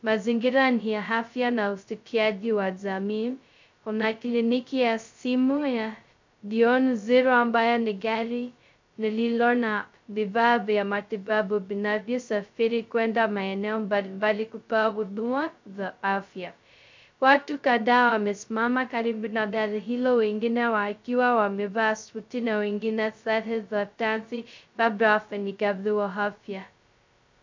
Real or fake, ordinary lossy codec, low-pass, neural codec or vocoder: fake; MP3, 64 kbps; 7.2 kHz; codec, 16 kHz, 0.2 kbps, FocalCodec